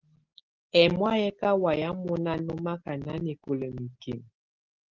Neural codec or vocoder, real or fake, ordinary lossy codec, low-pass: none; real; Opus, 16 kbps; 7.2 kHz